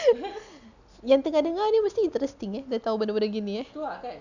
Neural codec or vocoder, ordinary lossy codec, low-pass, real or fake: none; none; 7.2 kHz; real